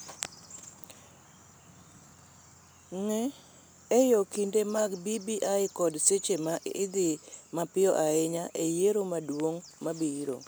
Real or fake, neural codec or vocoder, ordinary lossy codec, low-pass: real; none; none; none